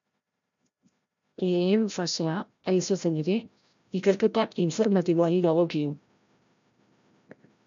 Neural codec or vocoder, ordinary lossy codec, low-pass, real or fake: codec, 16 kHz, 0.5 kbps, FreqCodec, larger model; MP3, 64 kbps; 7.2 kHz; fake